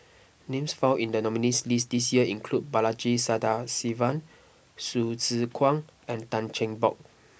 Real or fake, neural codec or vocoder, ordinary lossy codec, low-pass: real; none; none; none